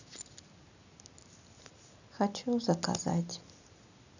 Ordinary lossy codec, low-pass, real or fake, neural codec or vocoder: none; 7.2 kHz; real; none